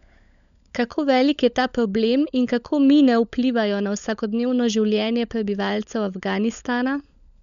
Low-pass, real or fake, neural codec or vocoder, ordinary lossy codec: 7.2 kHz; fake; codec, 16 kHz, 16 kbps, FunCodec, trained on LibriTTS, 50 frames a second; none